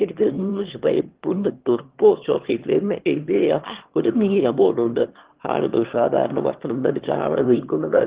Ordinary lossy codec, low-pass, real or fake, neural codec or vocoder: Opus, 16 kbps; 3.6 kHz; fake; autoencoder, 22.05 kHz, a latent of 192 numbers a frame, VITS, trained on one speaker